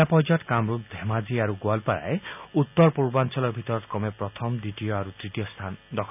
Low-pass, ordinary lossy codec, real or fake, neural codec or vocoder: 3.6 kHz; none; real; none